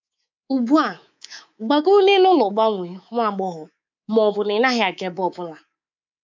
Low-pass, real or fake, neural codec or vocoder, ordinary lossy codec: 7.2 kHz; fake; codec, 24 kHz, 3.1 kbps, DualCodec; MP3, 64 kbps